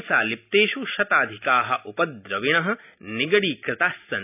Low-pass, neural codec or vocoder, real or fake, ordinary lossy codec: 3.6 kHz; none; real; none